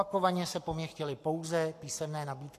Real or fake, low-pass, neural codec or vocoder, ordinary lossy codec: fake; 14.4 kHz; codec, 44.1 kHz, 7.8 kbps, Pupu-Codec; AAC, 64 kbps